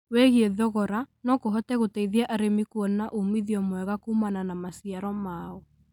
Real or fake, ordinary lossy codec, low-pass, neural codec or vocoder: real; none; 19.8 kHz; none